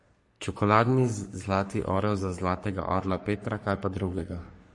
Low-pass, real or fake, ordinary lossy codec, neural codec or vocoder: 10.8 kHz; fake; MP3, 48 kbps; codec, 44.1 kHz, 3.4 kbps, Pupu-Codec